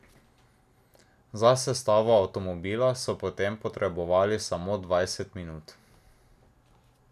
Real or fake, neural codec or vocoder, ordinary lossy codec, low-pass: real; none; none; 14.4 kHz